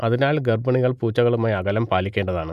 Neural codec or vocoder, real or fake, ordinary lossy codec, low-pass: none; real; none; 14.4 kHz